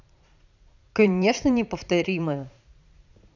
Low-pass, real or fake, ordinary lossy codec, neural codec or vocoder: 7.2 kHz; fake; none; vocoder, 44.1 kHz, 80 mel bands, Vocos